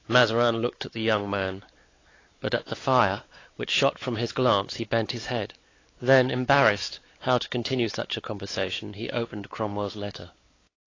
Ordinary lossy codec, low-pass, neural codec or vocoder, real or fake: AAC, 32 kbps; 7.2 kHz; codec, 16 kHz, 4 kbps, X-Codec, WavLM features, trained on Multilingual LibriSpeech; fake